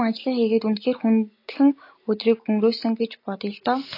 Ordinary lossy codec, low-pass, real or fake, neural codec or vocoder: AAC, 32 kbps; 5.4 kHz; real; none